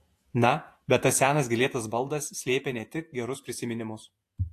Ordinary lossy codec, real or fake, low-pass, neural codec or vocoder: AAC, 64 kbps; fake; 14.4 kHz; vocoder, 48 kHz, 128 mel bands, Vocos